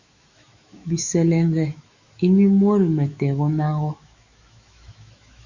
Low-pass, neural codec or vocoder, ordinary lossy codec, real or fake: 7.2 kHz; autoencoder, 48 kHz, 128 numbers a frame, DAC-VAE, trained on Japanese speech; Opus, 64 kbps; fake